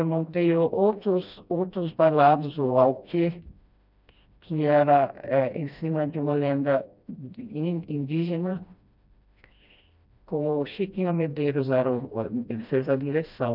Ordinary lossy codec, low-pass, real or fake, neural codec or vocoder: none; 5.4 kHz; fake; codec, 16 kHz, 1 kbps, FreqCodec, smaller model